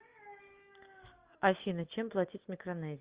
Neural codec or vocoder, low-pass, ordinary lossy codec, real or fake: none; 3.6 kHz; Opus, 32 kbps; real